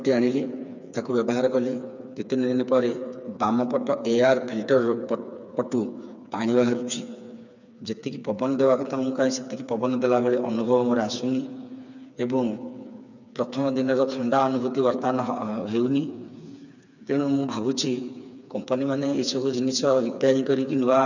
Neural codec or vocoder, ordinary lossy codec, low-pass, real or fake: codec, 16 kHz, 4 kbps, FreqCodec, smaller model; none; 7.2 kHz; fake